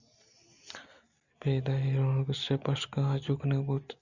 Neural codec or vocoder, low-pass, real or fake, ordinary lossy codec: none; 7.2 kHz; real; Opus, 64 kbps